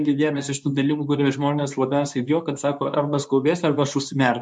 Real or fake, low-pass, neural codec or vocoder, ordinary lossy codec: fake; 10.8 kHz; codec, 24 kHz, 0.9 kbps, WavTokenizer, medium speech release version 2; MP3, 64 kbps